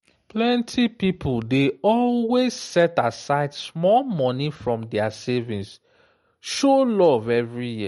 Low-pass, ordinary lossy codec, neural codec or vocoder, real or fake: 19.8 kHz; MP3, 48 kbps; none; real